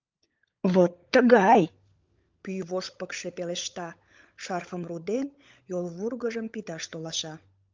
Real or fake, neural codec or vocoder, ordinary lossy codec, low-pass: fake; codec, 16 kHz, 16 kbps, FunCodec, trained on LibriTTS, 50 frames a second; Opus, 24 kbps; 7.2 kHz